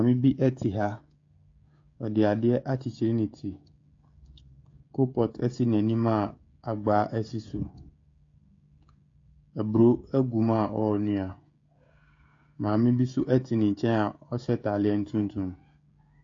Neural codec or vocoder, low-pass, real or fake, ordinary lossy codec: codec, 16 kHz, 16 kbps, FreqCodec, smaller model; 7.2 kHz; fake; AAC, 48 kbps